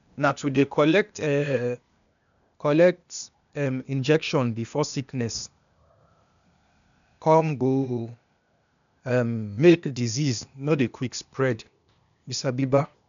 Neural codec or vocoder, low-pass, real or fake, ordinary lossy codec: codec, 16 kHz, 0.8 kbps, ZipCodec; 7.2 kHz; fake; none